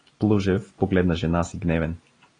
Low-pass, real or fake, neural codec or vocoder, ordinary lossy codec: 9.9 kHz; real; none; AAC, 32 kbps